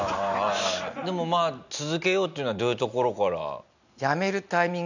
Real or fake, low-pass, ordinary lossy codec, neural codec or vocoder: real; 7.2 kHz; none; none